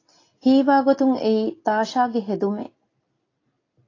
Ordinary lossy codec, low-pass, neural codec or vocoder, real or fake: AAC, 32 kbps; 7.2 kHz; none; real